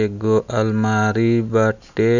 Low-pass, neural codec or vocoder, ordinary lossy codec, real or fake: 7.2 kHz; none; none; real